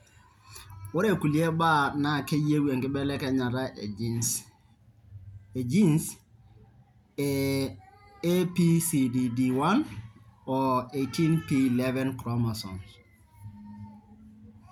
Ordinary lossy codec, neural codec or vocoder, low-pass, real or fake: none; none; 19.8 kHz; real